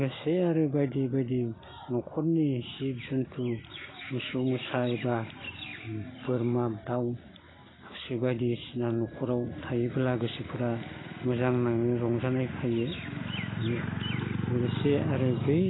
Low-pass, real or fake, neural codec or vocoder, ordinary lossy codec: 7.2 kHz; real; none; AAC, 16 kbps